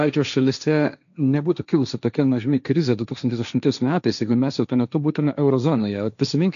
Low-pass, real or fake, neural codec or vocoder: 7.2 kHz; fake; codec, 16 kHz, 1.1 kbps, Voila-Tokenizer